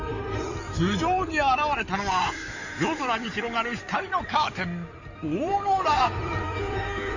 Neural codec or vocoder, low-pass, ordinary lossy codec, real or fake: codec, 16 kHz in and 24 kHz out, 2.2 kbps, FireRedTTS-2 codec; 7.2 kHz; none; fake